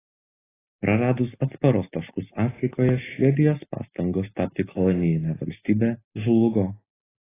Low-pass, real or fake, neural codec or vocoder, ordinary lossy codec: 3.6 kHz; real; none; AAC, 16 kbps